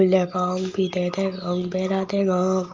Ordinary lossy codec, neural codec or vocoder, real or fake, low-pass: Opus, 32 kbps; none; real; 7.2 kHz